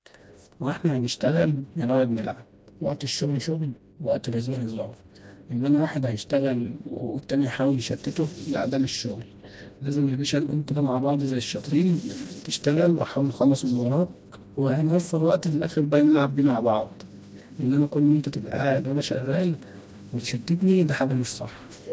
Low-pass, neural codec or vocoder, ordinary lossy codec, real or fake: none; codec, 16 kHz, 1 kbps, FreqCodec, smaller model; none; fake